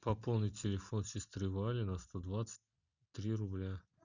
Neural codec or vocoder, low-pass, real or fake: none; 7.2 kHz; real